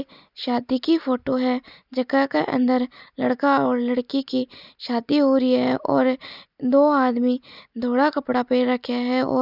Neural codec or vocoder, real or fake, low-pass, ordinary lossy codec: none; real; 5.4 kHz; none